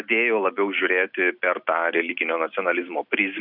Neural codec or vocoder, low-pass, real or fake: none; 5.4 kHz; real